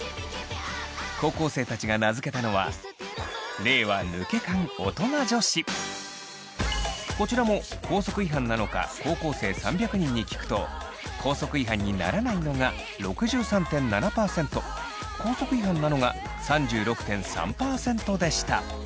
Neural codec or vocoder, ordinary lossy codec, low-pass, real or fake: none; none; none; real